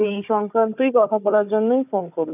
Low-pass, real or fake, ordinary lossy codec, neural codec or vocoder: 3.6 kHz; fake; none; codec, 16 kHz, 4 kbps, FunCodec, trained on Chinese and English, 50 frames a second